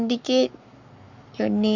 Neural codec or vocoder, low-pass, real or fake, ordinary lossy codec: codec, 44.1 kHz, 7.8 kbps, Pupu-Codec; 7.2 kHz; fake; none